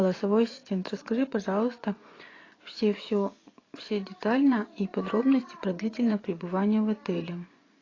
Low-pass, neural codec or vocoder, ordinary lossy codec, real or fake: 7.2 kHz; vocoder, 24 kHz, 100 mel bands, Vocos; AAC, 32 kbps; fake